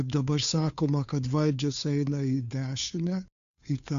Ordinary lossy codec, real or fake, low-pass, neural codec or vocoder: AAC, 96 kbps; fake; 7.2 kHz; codec, 16 kHz, 2 kbps, FunCodec, trained on Chinese and English, 25 frames a second